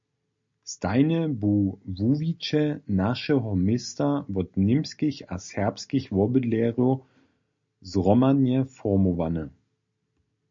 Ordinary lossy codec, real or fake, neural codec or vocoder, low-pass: MP3, 48 kbps; real; none; 7.2 kHz